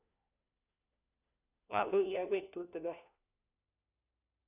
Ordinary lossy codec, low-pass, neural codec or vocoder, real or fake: none; 3.6 kHz; codec, 16 kHz, 1 kbps, FunCodec, trained on LibriTTS, 50 frames a second; fake